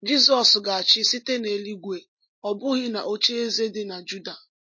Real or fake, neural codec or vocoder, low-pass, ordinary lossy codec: real; none; 7.2 kHz; MP3, 32 kbps